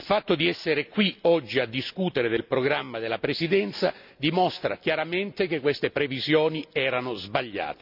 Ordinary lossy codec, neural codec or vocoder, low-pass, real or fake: none; none; 5.4 kHz; real